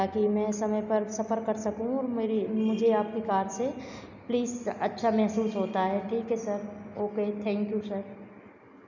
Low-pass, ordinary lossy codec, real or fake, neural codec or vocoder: 7.2 kHz; none; real; none